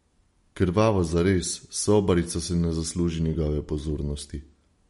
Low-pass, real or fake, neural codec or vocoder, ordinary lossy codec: 19.8 kHz; real; none; MP3, 48 kbps